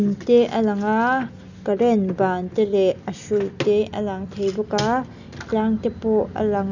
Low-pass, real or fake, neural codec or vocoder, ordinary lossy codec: 7.2 kHz; real; none; none